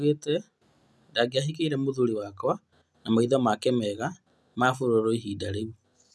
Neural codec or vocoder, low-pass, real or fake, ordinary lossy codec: none; none; real; none